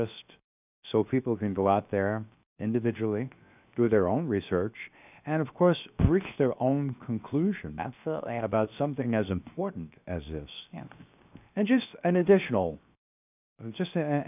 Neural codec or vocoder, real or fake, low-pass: codec, 16 kHz, 0.7 kbps, FocalCodec; fake; 3.6 kHz